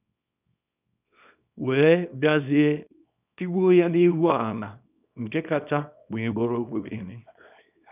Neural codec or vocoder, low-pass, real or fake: codec, 24 kHz, 0.9 kbps, WavTokenizer, small release; 3.6 kHz; fake